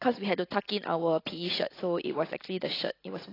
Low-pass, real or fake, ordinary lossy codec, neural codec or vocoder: 5.4 kHz; real; AAC, 24 kbps; none